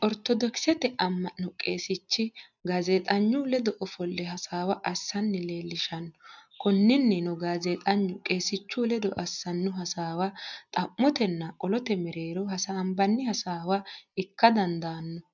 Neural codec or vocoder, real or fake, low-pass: none; real; 7.2 kHz